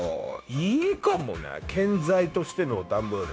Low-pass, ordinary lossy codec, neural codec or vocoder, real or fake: none; none; codec, 16 kHz, 0.9 kbps, LongCat-Audio-Codec; fake